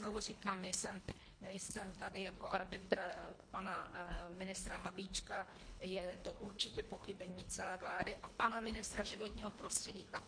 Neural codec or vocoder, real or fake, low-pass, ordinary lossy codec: codec, 24 kHz, 1.5 kbps, HILCodec; fake; 9.9 kHz; MP3, 48 kbps